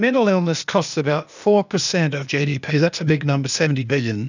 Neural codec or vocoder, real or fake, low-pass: codec, 16 kHz, 0.8 kbps, ZipCodec; fake; 7.2 kHz